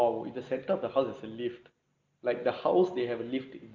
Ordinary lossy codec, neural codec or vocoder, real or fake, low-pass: Opus, 24 kbps; none; real; 7.2 kHz